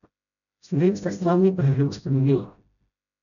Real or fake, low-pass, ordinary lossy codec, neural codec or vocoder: fake; 7.2 kHz; none; codec, 16 kHz, 0.5 kbps, FreqCodec, smaller model